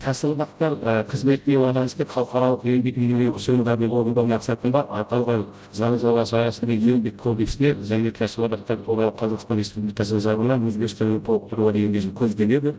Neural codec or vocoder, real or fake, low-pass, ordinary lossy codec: codec, 16 kHz, 0.5 kbps, FreqCodec, smaller model; fake; none; none